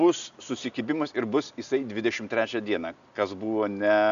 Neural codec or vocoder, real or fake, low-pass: none; real; 7.2 kHz